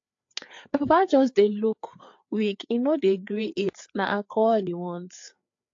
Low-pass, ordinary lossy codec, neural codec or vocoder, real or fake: 7.2 kHz; AAC, 48 kbps; codec, 16 kHz, 4 kbps, FreqCodec, larger model; fake